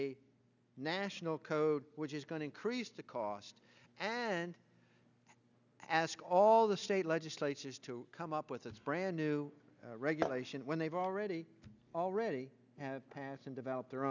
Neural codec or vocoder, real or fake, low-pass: none; real; 7.2 kHz